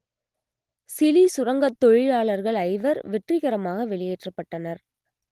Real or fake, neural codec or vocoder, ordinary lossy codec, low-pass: real; none; Opus, 24 kbps; 14.4 kHz